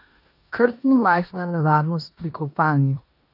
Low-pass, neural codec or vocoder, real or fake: 5.4 kHz; codec, 16 kHz in and 24 kHz out, 0.9 kbps, LongCat-Audio-Codec, four codebook decoder; fake